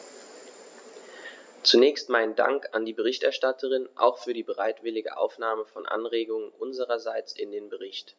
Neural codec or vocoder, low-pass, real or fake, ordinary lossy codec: none; none; real; none